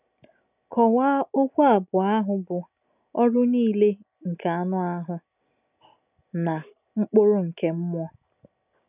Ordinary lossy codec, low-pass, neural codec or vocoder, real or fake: none; 3.6 kHz; none; real